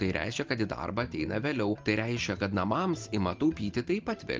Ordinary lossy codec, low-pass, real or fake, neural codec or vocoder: Opus, 24 kbps; 7.2 kHz; real; none